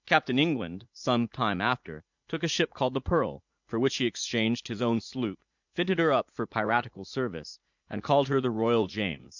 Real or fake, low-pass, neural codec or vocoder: fake; 7.2 kHz; vocoder, 44.1 kHz, 80 mel bands, Vocos